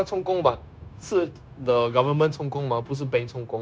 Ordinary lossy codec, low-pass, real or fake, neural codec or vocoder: none; none; fake; codec, 16 kHz, 0.9 kbps, LongCat-Audio-Codec